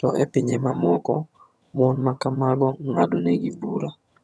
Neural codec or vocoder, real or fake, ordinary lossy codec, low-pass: vocoder, 22.05 kHz, 80 mel bands, HiFi-GAN; fake; none; none